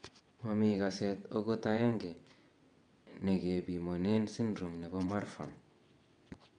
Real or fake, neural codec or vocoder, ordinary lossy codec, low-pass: fake; vocoder, 22.05 kHz, 80 mel bands, WaveNeXt; none; 9.9 kHz